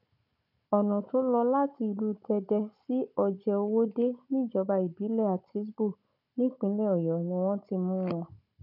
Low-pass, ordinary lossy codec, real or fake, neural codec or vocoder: 5.4 kHz; none; fake; codec, 16 kHz, 16 kbps, FunCodec, trained on Chinese and English, 50 frames a second